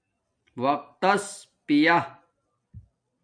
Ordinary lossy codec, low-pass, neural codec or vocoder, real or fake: MP3, 96 kbps; 9.9 kHz; none; real